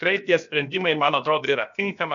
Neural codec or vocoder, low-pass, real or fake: codec, 16 kHz, about 1 kbps, DyCAST, with the encoder's durations; 7.2 kHz; fake